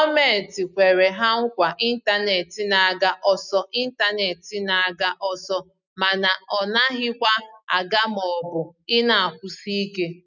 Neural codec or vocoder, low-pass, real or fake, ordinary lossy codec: none; 7.2 kHz; real; none